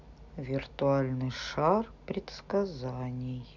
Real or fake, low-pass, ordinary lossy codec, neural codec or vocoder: real; 7.2 kHz; none; none